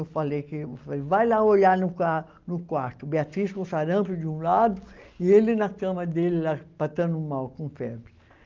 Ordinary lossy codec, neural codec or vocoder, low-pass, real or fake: Opus, 32 kbps; none; 7.2 kHz; real